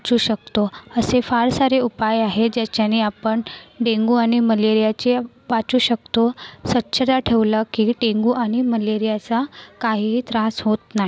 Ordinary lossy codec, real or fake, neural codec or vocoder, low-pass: none; real; none; none